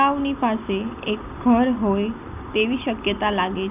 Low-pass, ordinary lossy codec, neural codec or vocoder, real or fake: 3.6 kHz; none; none; real